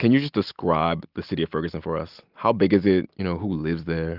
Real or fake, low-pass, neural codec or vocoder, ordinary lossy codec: real; 5.4 kHz; none; Opus, 32 kbps